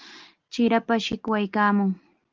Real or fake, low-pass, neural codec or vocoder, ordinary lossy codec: real; 7.2 kHz; none; Opus, 24 kbps